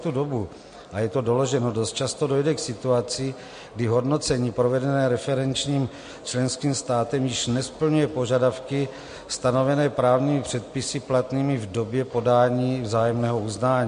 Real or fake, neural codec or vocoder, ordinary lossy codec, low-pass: real; none; MP3, 48 kbps; 9.9 kHz